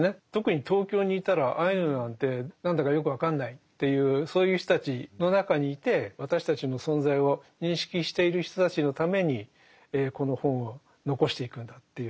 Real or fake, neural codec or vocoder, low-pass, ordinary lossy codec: real; none; none; none